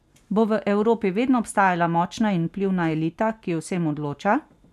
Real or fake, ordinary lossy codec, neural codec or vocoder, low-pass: real; none; none; 14.4 kHz